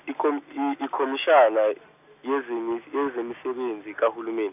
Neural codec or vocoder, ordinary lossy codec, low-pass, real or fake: none; none; 3.6 kHz; real